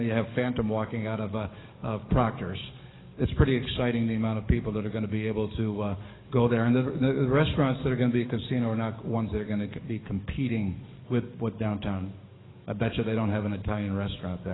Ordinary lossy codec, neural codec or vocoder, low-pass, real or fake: AAC, 16 kbps; codec, 44.1 kHz, 7.8 kbps, DAC; 7.2 kHz; fake